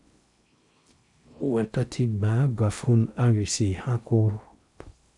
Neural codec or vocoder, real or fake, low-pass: codec, 16 kHz in and 24 kHz out, 0.6 kbps, FocalCodec, streaming, 4096 codes; fake; 10.8 kHz